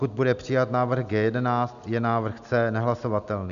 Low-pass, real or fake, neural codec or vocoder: 7.2 kHz; real; none